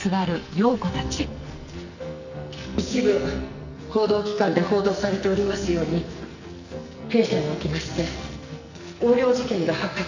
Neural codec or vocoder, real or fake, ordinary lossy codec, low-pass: codec, 44.1 kHz, 2.6 kbps, SNAC; fake; none; 7.2 kHz